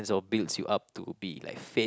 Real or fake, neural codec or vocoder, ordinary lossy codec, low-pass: real; none; none; none